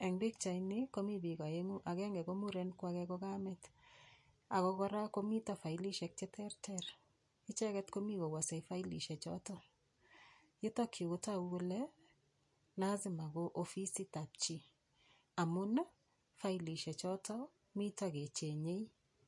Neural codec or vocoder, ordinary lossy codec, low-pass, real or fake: none; MP3, 48 kbps; 10.8 kHz; real